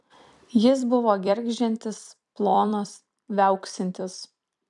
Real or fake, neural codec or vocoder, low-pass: fake; vocoder, 44.1 kHz, 128 mel bands every 256 samples, BigVGAN v2; 10.8 kHz